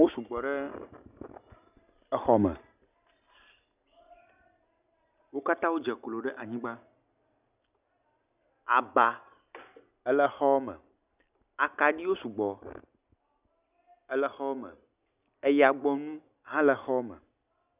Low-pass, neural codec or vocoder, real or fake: 3.6 kHz; none; real